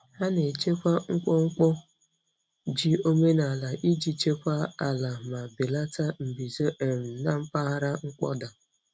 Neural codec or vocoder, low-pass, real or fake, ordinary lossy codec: none; none; real; none